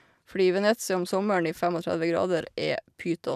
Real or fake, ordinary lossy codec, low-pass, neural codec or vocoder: real; none; 14.4 kHz; none